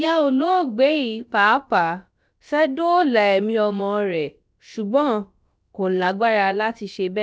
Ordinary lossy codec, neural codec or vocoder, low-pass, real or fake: none; codec, 16 kHz, about 1 kbps, DyCAST, with the encoder's durations; none; fake